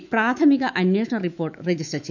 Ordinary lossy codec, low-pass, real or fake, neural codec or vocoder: none; 7.2 kHz; fake; autoencoder, 48 kHz, 128 numbers a frame, DAC-VAE, trained on Japanese speech